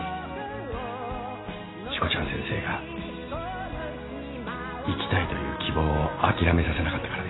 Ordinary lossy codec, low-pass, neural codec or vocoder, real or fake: AAC, 16 kbps; 7.2 kHz; none; real